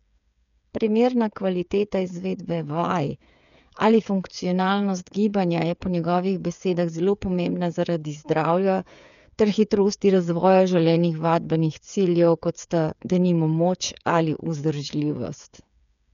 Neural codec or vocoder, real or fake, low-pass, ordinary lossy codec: codec, 16 kHz, 8 kbps, FreqCodec, smaller model; fake; 7.2 kHz; none